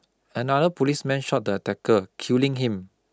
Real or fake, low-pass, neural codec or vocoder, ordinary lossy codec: real; none; none; none